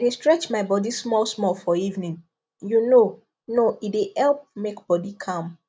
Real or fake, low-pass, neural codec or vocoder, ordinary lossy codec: real; none; none; none